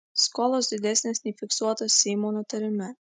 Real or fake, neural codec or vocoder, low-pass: real; none; 10.8 kHz